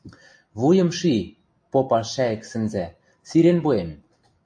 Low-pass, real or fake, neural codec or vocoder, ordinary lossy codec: 9.9 kHz; real; none; AAC, 64 kbps